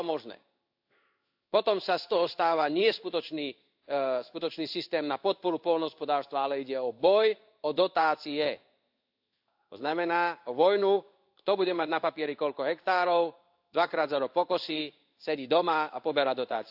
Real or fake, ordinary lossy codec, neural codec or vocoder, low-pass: fake; AAC, 48 kbps; codec, 16 kHz in and 24 kHz out, 1 kbps, XY-Tokenizer; 5.4 kHz